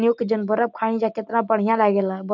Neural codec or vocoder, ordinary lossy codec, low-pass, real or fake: none; none; 7.2 kHz; real